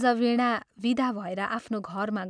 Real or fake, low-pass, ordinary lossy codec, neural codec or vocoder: real; 9.9 kHz; none; none